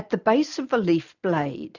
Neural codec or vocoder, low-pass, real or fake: none; 7.2 kHz; real